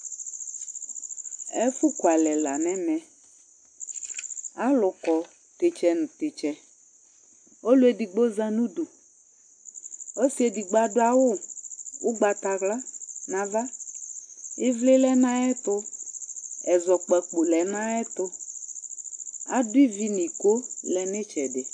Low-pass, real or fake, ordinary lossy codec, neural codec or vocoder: 9.9 kHz; real; AAC, 64 kbps; none